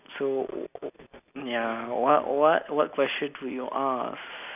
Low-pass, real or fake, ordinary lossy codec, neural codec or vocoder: 3.6 kHz; real; none; none